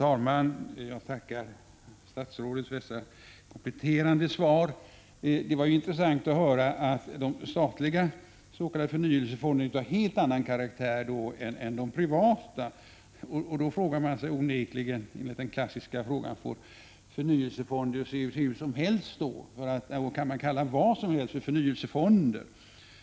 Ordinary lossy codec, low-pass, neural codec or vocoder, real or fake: none; none; none; real